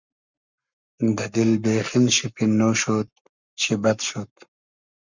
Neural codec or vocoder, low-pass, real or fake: codec, 44.1 kHz, 7.8 kbps, Pupu-Codec; 7.2 kHz; fake